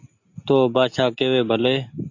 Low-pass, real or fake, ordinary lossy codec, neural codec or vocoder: 7.2 kHz; real; AAC, 48 kbps; none